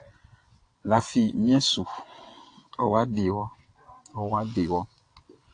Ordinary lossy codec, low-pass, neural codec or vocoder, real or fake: AAC, 48 kbps; 9.9 kHz; vocoder, 22.05 kHz, 80 mel bands, WaveNeXt; fake